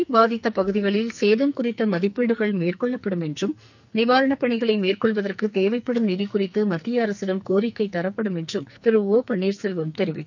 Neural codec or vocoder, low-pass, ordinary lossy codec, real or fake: codec, 44.1 kHz, 2.6 kbps, SNAC; 7.2 kHz; none; fake